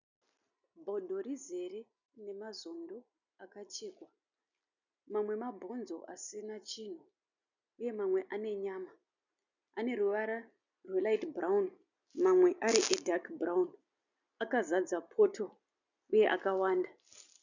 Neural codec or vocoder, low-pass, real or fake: none; 7.2 kHz; real